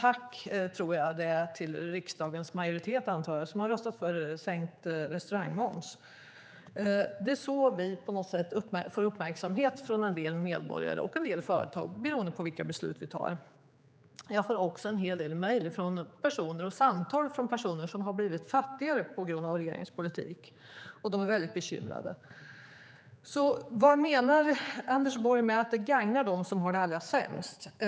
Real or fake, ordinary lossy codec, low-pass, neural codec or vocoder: fake; none; none; codec, 16 kHz, 4 kbps, X-Codec, HuBERT features, trained on general audio